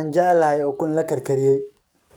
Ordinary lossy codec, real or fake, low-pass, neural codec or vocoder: none; fake; none; codec, 44.1 kHz, 7.8 kbps, DAC